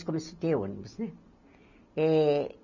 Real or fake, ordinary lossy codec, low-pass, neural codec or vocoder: real; none; 7.2 kHz; none